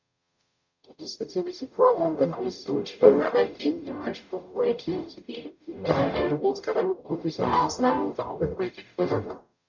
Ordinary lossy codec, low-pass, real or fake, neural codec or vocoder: none; 7.2 kHz; fake; codec, 44.1 kHz, 0.9 kbps, DAC